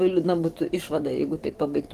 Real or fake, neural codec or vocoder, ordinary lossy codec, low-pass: fake; codec, 44.1 kHz, 7.8 kbps, DAC; Opus, 16 kbps; 14.4 kHz